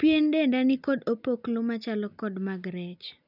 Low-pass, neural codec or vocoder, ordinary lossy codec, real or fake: 5.4 kHz; none; none; real